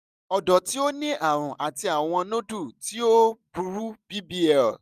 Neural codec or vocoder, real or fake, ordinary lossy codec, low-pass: none; real; none; 14.4 kHz